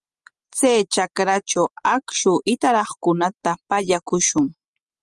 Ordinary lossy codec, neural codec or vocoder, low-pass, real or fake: Opus, 32 kbps; none; 10.8 kHz; real